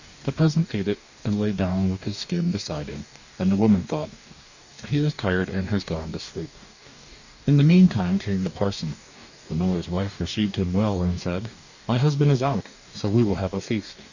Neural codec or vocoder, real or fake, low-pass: codec, 44.1 kHz, 2.6 kbps, DAC; fake; 7.2 kHz